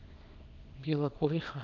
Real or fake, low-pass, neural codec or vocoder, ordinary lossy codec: fake; 7.2 kHz; codec, 24 kHz, 0.9 kbps, WavTokenizer, small release; MP3, 64 kbps